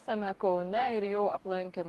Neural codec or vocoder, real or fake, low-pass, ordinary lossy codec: codec, 44.1 kHz, 2.6 kbps, DAC; fake; 14.4 kHz; Opus, 24 kbps